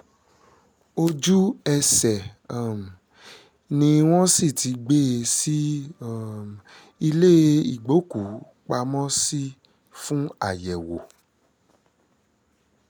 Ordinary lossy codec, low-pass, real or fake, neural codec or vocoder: none; none; real; none